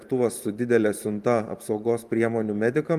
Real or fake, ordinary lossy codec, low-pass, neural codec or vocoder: fake; Opus, 32 kbps; 14.4 kHz; vocoder, 44.1 kHz, 128 mel bands every 512 samples, BigVGAN v2